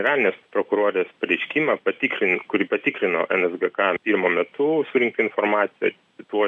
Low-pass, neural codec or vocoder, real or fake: 10.8 kHz; none; real